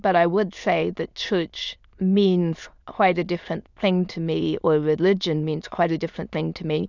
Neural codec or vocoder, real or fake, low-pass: autoencoder, 22.05 kHz, a latent of 192 numbers a frame, VITS, trained on many speakers; fake; 7.2 kHz